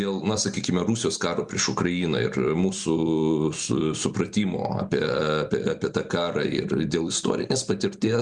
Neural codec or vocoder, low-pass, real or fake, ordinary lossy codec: vocoder, 44.1 kHz, 128 mel bands every 256 samples, BigVGAN v2; 10.8 kHz; fake; Opus, 64 kbps